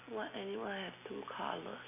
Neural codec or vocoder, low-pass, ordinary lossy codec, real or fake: none; 3.6 kHz; none; real